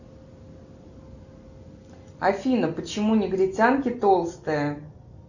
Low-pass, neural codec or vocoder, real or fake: 7.2 kHz; none; real